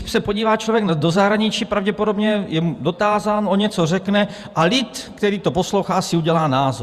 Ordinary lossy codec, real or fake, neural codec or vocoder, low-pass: Opus, 64 kbps; fake; vocoder, 48 kHz, 128 mel bands, Vocos; 14.4 kHz